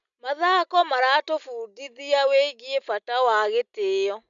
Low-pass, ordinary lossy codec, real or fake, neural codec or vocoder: 7.2 kHz; MP3, 96 kbps; real; none